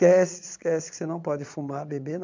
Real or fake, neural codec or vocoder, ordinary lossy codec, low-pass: fake; vocoder, 22.05 kHz, 80 mel bands, Vocos; MP3, 64 kbps; 7.2 kHz